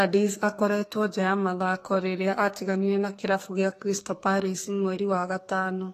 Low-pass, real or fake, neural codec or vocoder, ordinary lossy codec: 14.4 kHz; fake; codec, 44.1 kHz, 2.6 kbps, SNAC; AAC, 48 kbps